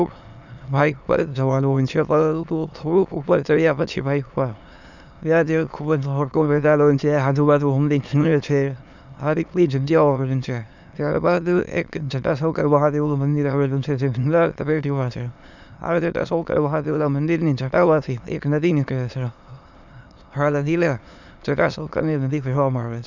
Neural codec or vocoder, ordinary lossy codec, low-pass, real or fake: autoencoder, 22.05 kHz, a latent of 192 numbers a frame, VITS, trained on many speakers; none; 7.2 kHz; fake